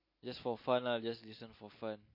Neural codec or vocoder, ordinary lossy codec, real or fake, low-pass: none; MP3, 32 kbps; real; 5.4 kHz